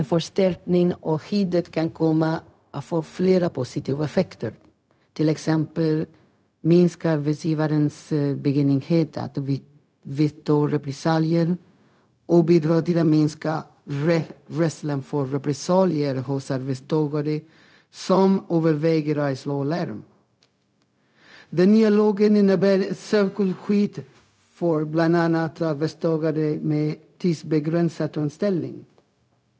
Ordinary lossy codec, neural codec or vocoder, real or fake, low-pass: none; codec, 16 kHz, 0.4 kbps, LongCat-Audio-Codec; fake; none